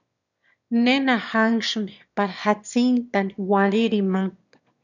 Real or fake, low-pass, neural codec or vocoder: fake; 7.2 kHz; autoencoder, 22.05 kHz, a latent of 192 numbers a frame, VITS, trained on one speaker